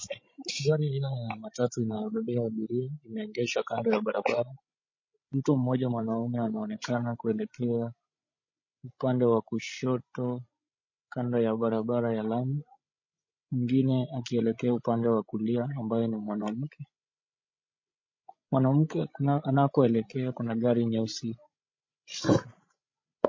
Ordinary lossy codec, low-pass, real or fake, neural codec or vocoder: MP3, 32 kbps; 7.2 kHz; fake; codec, 16 kHz, 16 kbps, FreqCodec, larger model